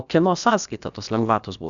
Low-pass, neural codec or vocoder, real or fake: 7.2 kHz; codec, 16 kHz, about 1 kbps, DyCAST, with the encoder's durations; fake